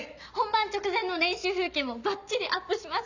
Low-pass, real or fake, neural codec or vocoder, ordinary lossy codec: 7.2 kHz; real; none; none